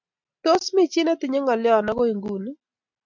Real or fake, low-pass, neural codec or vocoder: real; 7.2 kHz; none